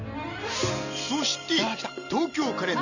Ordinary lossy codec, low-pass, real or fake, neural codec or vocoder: none; 7.2 kHz; real; none